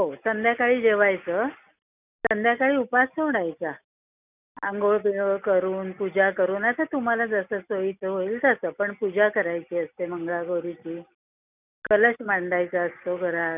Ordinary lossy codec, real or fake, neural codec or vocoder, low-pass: none; real; none; 3.6 kHz